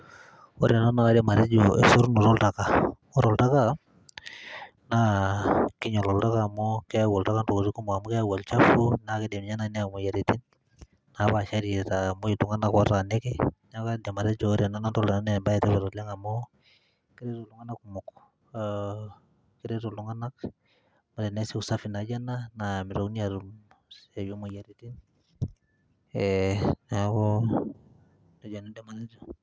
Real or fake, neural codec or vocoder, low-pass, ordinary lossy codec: real; none; none; none